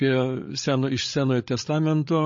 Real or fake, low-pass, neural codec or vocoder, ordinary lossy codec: fake; 7.2 kHz; codec, 16 kHz, 16 kbps, FreqCodec, larger model; MP3, 32 kbps